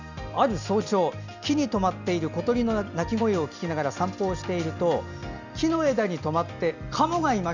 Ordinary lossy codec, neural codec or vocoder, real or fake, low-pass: none; none; real; 7.2 kHz